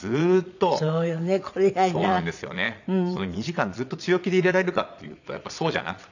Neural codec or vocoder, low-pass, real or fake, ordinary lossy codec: vocoder, 22.05 kHz, 80 mel bands, Vocos; 7.2 kHz; fake; none